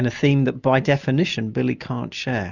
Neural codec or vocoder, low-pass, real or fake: none; 7.2 kHz; real